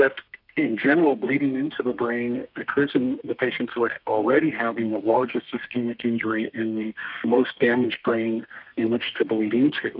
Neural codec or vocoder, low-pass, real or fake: codec, 32 kHz, 1.9 kbps, SNAC; 5.4 kHz; fake